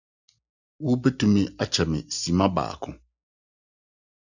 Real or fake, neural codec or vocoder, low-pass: real; none; 7.2 kHz